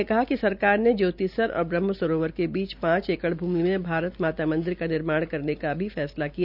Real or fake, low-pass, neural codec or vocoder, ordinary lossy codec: real; 5.4 kHz; none; none